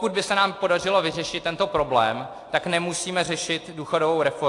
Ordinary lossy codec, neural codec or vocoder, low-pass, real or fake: AAC, 48 kbps; none; 10.8 kHz; real